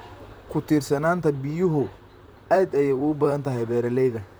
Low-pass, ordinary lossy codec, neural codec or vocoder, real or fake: none; none; vocoder, 44.1 kHz, 128 mel bands, Pupu-Vocoder; fake